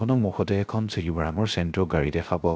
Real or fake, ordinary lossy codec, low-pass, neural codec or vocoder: fake; none; none; codec, 16 kHz, 0.3 kbps, FocalCodec